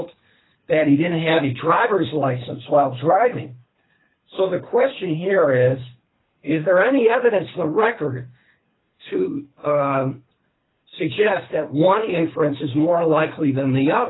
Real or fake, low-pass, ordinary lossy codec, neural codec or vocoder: fake; 7.2 kHz; AAC, 16 kbps; codec, 24 kHz, 3 kbps, HILCodec